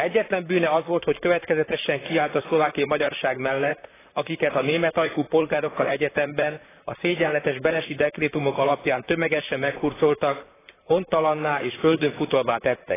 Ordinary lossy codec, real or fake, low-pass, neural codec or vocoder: AAC, 16 kbps; fake; 3.6 kHz; vocoder, 44.1 kHz, 128 mel bands, Pupu-Vocoder